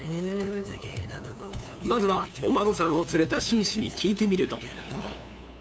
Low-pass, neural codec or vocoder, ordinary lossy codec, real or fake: none; codec, 16 kHz, 2 kbps, FunCodec, trained on LibriTTS, 25 frames a second; none; fake